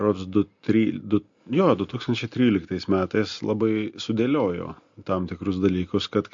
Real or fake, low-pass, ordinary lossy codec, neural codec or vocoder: real; 7.2 kHz; MP3, 48 kbps; none